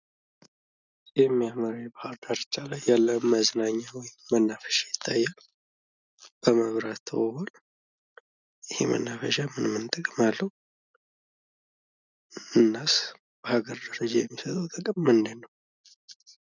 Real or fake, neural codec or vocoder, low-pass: real; none; 7.2 kHz